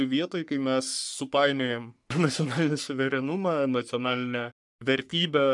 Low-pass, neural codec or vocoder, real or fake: 10.8 kHz; codec, 44.1 kHz, 3.4 kbps, Pupu-Codec; fake